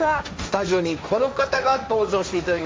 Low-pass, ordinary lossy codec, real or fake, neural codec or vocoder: none; none; fake; codec, 16 kHz, 1.1 kbps, Voila-Tokenizer